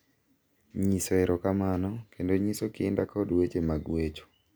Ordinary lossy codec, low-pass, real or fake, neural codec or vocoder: none; none; real; none